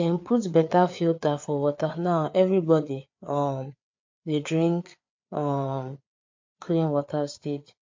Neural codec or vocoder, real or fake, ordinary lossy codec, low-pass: codec, 16 kHz, 4 kbps, FreqCodec, larger model; fake; MP3, 48 kbps; 7.2 kHz